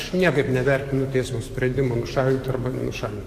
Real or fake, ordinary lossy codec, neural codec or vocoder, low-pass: fake; AAC, 64 kbps; codec, 44.1 kHz, 7.8 kbps, DAC; 14.4 kHz